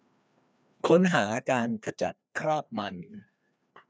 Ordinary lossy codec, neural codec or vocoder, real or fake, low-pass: none; codec, 16 kHz, 2 kbps, FreqCodec, larger model; fake; none